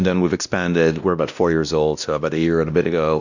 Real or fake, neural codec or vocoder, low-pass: fake; codec, 16 kHz, 1 kbps, X-Codec, WavLM features, trained on Multilingual LibriSpeech; 7.2 kHz